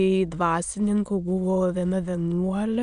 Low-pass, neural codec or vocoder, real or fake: 9.9 kHz; autoencoder, 22.05 kHz, a latent of 192 numbers a frame, VITS, trained on many speakers; fake